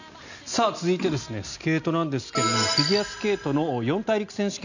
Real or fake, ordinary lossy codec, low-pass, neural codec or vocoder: real; none; 7.2 kHz; none